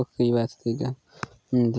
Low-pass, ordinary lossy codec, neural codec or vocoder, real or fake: none; none; none; real